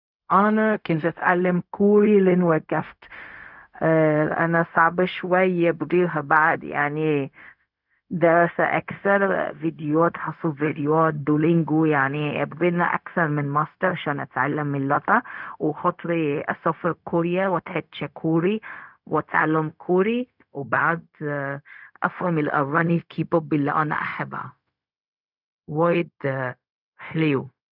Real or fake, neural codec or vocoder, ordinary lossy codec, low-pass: fake; codec, 16 kHz, 0.4 kbps, LongCat-Audio-Codec; none; 5.4 kHz